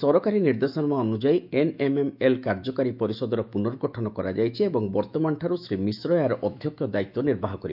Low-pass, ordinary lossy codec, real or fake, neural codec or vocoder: 5.4 kHz; none; fake; autoencoder, 48 kHz, 128 numbers a frame, DAC-VAE, trained on Japanese speech